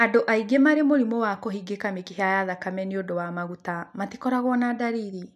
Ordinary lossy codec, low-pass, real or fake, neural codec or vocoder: none; 14.4 kHz; real; none